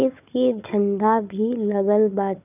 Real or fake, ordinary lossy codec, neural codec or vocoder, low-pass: fake; none; codec, 16 kHz, 4 kbps, FunCodec, trained on Chinese and English, 50 frames a second; 3.6 kHz